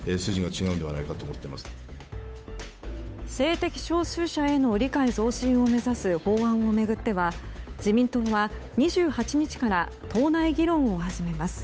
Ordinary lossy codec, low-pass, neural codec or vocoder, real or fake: none; none; codec, 16 kHz, 8 kbps, FunCodec, trained on Chinese and English, 25 frames a second; fake